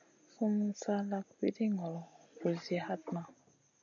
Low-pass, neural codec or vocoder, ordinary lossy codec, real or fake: 7.2 kHz; none; AAC, 64 kbps; real